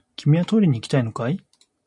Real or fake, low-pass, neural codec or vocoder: real; 10.8 kHz; none